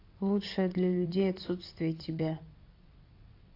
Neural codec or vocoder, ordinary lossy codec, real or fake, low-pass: vocoder, 22.05 kHz, 80 mel bands, WaveNeXt; AAC, 32 kbps; fake; 5.4 kHz